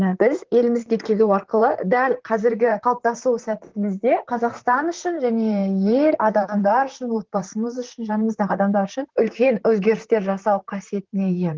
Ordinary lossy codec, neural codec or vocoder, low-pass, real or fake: Opus, 16 kbps; vocoder, 44.1 kHz, 128 mel bands, Pupu-Vocoder; 7.2 kHz; fake